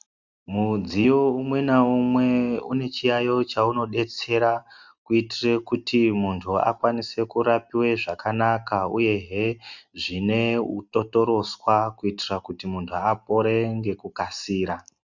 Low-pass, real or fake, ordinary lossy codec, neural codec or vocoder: 7.2 kHz; real; Opus, 64 kbps; none